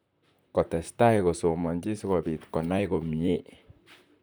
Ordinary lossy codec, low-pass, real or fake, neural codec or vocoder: none; none; fake; vocoder, 44.1 kHz, 128 mel bands, Pupu-Vocoder